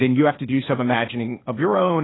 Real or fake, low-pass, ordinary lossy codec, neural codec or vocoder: fake; 7.2 kHz; AAC, 16 kbps; codec, 16 kHz, 0.8 kbps, ZipCodec